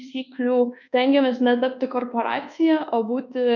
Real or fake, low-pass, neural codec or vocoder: fake; 7.2 kHz; codec, 24 kHz, 1.2 kbps, DualCodec